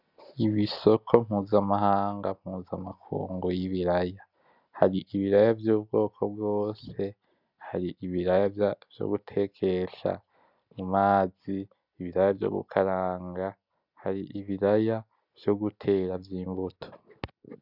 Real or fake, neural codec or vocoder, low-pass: real; none; 5.4 kHz